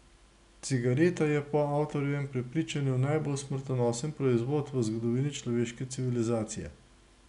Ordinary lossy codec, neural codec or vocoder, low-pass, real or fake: none; none; 10.8 kHz; real